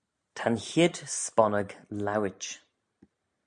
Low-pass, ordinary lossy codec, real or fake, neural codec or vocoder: 9.9 kHz; MP3, 64 kbps; real; none